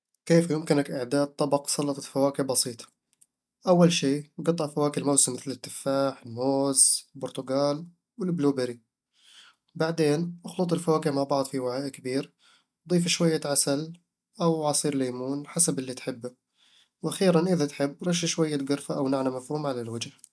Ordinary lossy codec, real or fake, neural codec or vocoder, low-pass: none; real; none; none